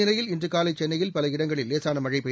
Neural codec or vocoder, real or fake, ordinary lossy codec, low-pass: none; real; none; none